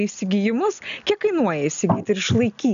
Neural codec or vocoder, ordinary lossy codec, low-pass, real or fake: none; MP3, 96 kbps; 7.2 kHz; real